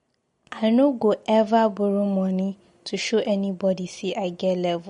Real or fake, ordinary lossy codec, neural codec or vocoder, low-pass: real; MP3, 48 kbps; none; 10.8 kHz